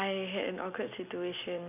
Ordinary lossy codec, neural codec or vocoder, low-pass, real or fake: none; none; 3.6 kHz; real